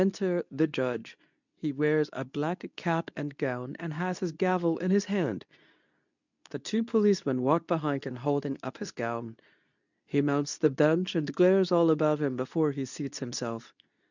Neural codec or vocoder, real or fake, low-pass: codec, 24 kHz, 0.9 kbps, WavTokenizer, medium speech release version 2; fake; 7.2 kHz